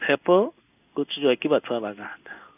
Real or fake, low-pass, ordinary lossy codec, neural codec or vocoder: fake; 3.6 kHz; none; codec, 16 kHz in and 24 kHz out, 1 kbps, XY-Tokenizer